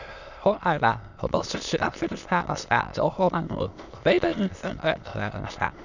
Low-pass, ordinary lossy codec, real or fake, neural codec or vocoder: 7.2 kHz; none; fake; autoencoder, 22.05 kHz, a latent of 192 numbers a frame, VITS, trained on many speakers